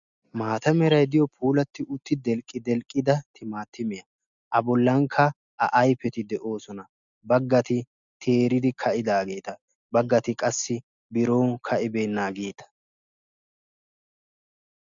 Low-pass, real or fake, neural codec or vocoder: 7.2 kHz; real; none